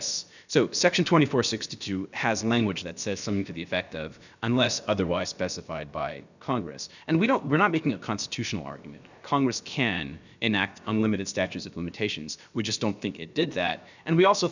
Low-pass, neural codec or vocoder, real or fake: 7.2 kHz; codec, 16 kHz, about 1 kbps, DyCAST, with the encoder's durations; fake